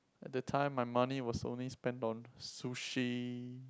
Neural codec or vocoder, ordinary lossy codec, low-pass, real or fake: none; none; none; real